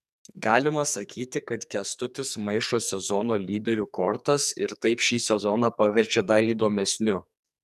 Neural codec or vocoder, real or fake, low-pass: codec, 44.1 kHz, 2.6 kbps, SNAC; fake; 14.4 kHz